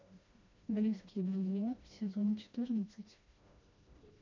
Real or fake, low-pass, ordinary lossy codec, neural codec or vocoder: fake; 7.2 kHz; MP3, 64 kbps; codec, 16 kHz, 1 kbps, FreqCodec, smaller model